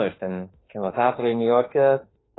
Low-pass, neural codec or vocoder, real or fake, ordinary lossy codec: 7.2 kHz; autoencoder, 48 kHz, 32 numbers a frame, DAC-VAE, trained on Japanese speech; fake; AAC, 16 kbps